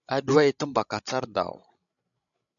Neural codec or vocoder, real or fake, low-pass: codec, 16 kHz, 16 kbps, FreqCodec, larger model; fake; 7.2 kHz